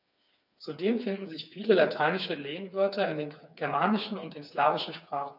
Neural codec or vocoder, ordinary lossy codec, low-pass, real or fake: codec, 16 kHz, 4 kbps, FreqCodec, smaller model; none; 5.4 kHz; fake